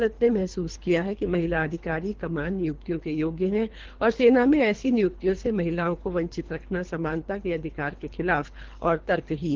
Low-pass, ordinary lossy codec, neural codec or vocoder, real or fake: 7.2 kHz; Opus, 16 kbps; codec, 24 kHz, 3 kbps, HILCodec; fake